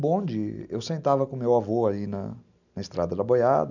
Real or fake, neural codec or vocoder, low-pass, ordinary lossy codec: real; none; 7.2 kHz; none